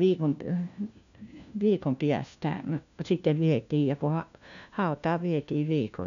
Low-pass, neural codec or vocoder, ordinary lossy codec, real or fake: 7.2 kHz; codec, 16 kHz, 1 kbps, FunCodec, trained on LibriTTS, 50 frames a second; none; fake